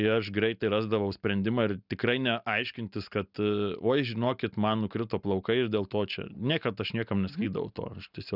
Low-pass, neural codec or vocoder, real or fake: 5.4 kHz; none; real